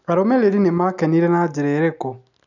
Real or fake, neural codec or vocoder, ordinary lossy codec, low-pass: real; none; none; 7.2 kHz